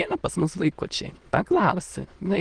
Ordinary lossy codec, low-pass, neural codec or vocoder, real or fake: Opus, 16 kbps; 9.9 kHz; autoencoder, 22.05 kHz, a latent of 192 numbers a frame, VITS, trained on many speakers; fake